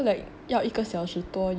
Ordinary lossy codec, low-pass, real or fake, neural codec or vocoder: none; none; real; none